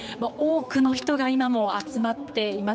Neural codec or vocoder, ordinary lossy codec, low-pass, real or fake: codec, 16 kHz, 4 kbps, X-Codec, HuBERT features, trained on general audio; none; none; fake